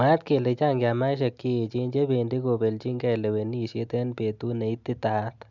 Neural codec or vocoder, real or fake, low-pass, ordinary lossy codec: none; real; 7.2 kHz; none